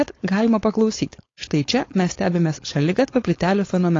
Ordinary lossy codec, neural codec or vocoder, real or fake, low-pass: AAC, 32 kbps; codec, 16 kHz, 4.8 kbps, FACodec; fake; 7.2 kHz